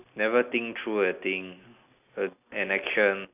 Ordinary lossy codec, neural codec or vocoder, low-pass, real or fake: none; none; 3.6 kHz; real